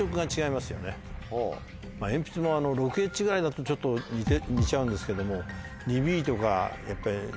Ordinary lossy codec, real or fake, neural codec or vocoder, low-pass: none; real; none; none